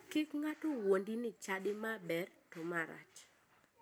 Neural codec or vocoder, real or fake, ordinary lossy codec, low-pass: none; real; none; none